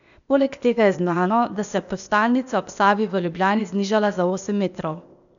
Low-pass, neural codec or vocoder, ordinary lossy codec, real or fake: 7.2 kHz; codec, 16 kHz, 0.8 kbps, ZipCodec; none; fake